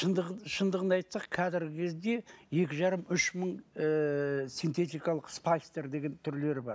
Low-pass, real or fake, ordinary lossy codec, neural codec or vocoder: none; real; none; none